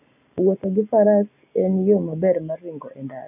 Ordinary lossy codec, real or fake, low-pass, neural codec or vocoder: none; real; 3.6 kHz; none